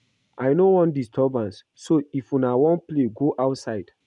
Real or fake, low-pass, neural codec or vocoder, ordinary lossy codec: real; 10.8 kHz; none; MP3, 96 kbps